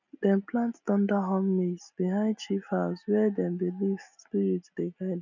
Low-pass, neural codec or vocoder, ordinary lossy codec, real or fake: 7.2 kHz; none; none; real